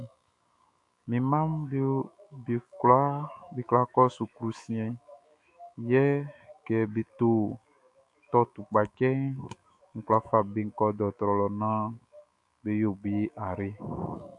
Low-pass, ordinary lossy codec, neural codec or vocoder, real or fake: 10.8 kHz; AAC, 64 kbps; autoencoder, 48 kHz, 128 numbers a frame, DAC-VAE, trained on Japanese speech; fake